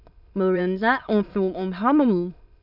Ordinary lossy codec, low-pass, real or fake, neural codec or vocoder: none; 5.4 kHz; fake; autoencoder, 22.05 kHz, a latent of 192 numbers a frame, VITS, trained on many speakers